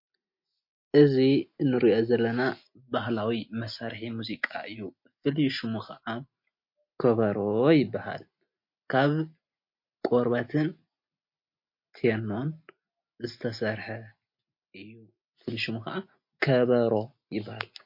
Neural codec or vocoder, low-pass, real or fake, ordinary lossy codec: none; 5.4 kHz; real; MP3, 32 kbps